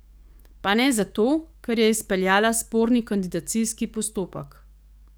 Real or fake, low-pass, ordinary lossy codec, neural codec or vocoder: fake; none; none; codec, 44.1 kHz, 7.8 kbps, DAC